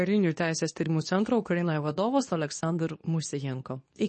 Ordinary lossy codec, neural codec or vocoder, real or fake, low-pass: MP3, 32 kbps; codec, 24 kHz, 0.9 kbps, WavTokenizer, medium speech release version 2; fake; 10.8 kHz